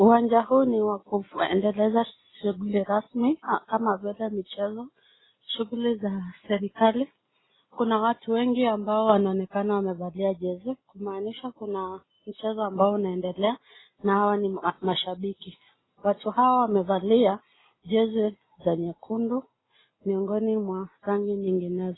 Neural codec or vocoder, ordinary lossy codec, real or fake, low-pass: none; AAC, 16 kbps; real; 7.2 kHz